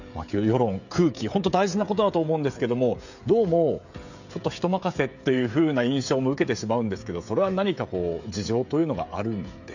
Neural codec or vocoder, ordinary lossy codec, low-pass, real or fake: codec, 16 kHz, 16 kbps, FreqCodec, smaller model; none; 7.2 kHz; fake